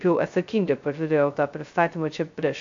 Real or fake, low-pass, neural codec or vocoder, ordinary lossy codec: fake; 7.2 kHz; codec, 16 kHz, 0.2 kbps, FocalCodec; AAC, 64 kbps